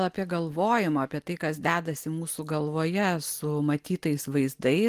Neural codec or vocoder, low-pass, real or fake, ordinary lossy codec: none; 14.4 kHz; real; Opus, 24 kbps